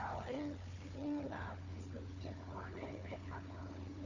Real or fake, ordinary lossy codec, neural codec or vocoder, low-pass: fake; none; codec, 16 kHz, 4 kbps, FunCodec, trained on Chinese and English, 50 frames a second; 7.2 kHz